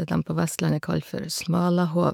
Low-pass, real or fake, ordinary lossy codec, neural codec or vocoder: 19.8 kHz; fake; none; autoencoder, 48 kHz, 128 numbers a frame, DAC-VAE, trained on Japanese speech